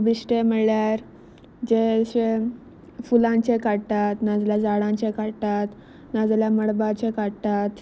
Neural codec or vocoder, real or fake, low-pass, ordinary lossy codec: none; real; none; none